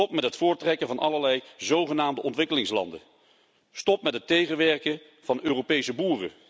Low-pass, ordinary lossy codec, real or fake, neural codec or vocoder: none; none; real; none